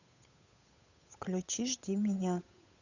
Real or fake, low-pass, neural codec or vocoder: fake; 7.2 kHz; codec, 16 kHz, 8 kbps, FunCodec, trained on Chinese and English, 25 frames a second